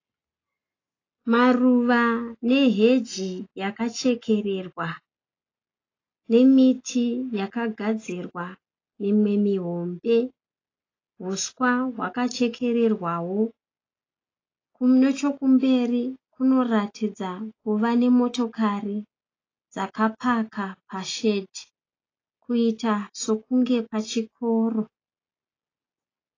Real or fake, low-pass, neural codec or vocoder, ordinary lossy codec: real; 7.2 kHz; none; AAC, 32 kbps